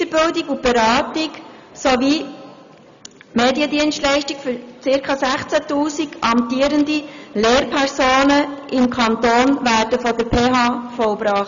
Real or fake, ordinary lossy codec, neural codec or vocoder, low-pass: real; none; none; 7.2 kHz